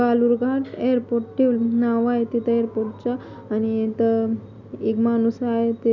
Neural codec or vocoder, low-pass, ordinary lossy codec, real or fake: none; 7.2 kHz; none; real